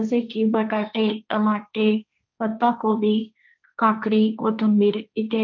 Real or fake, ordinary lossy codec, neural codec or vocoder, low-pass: fake; none; codec, 16 kHz, 1.1 kbps, Voila-Tokenizer; 7.2 kHz